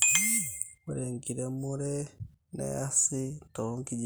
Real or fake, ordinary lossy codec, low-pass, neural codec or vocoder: real; none; none; none